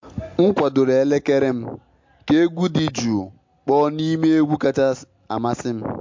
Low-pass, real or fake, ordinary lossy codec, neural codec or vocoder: 7.2 kHz; real; MP3, 48 kbps; none